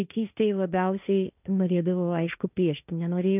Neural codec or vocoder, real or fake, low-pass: codec, 16 kHz, 1.1 kbps, Voila-Tokenizer; fake; 3.6 kHz